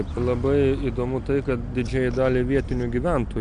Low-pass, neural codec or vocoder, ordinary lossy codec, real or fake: 9.9 kHz; none; Opus, 64 kbps; real